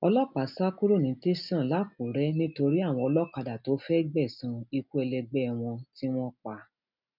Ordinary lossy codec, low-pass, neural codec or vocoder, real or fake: none; 5.4 kHz; none; real